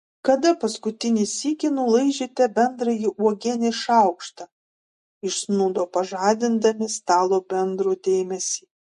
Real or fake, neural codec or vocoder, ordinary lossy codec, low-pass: real; none; MP3, 48 kbps; 14.4 kHz